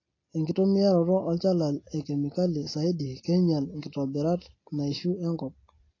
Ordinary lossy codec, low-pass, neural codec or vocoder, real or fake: AAC, 32 kbps; 7.2 kHz; none; real